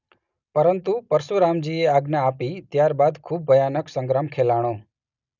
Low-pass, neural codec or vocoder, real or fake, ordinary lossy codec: 7.2 kHz; none; real; none